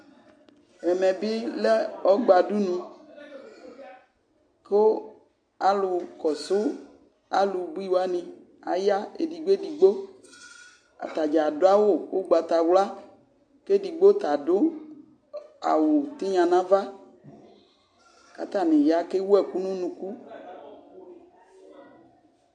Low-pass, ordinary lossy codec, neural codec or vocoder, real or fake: 9.9 kHz; AAC, 64 kbps; none; real